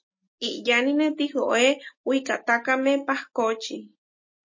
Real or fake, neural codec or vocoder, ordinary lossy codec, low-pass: real; none; MP3, 32 kbps; 7.2 kHz